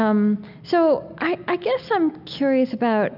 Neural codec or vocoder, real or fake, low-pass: none; real; 5.4 kHz